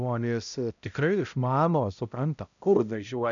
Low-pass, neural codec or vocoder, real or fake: 7.2 kHz; codec, 16 kHz, 0.5 kbps, X-Codec, HuBERT features, trained on balanced general audio; fake